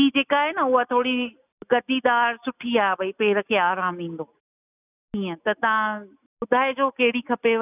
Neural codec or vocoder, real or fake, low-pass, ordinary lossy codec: none; real; 3.6 kHz; none